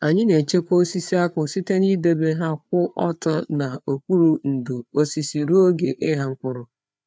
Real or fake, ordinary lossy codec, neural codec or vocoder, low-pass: fake; none; codec, 16 kHz, 4 kbps, FreqCodec, larger model; none